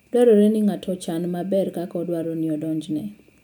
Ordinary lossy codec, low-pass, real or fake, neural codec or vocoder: none; none; real; none